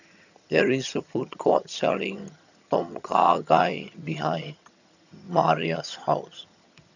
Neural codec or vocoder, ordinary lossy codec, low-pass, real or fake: vocoder, 22.05 kHz, 80 mel bands, HiFi-GAN; none; 7.2 kHz; fake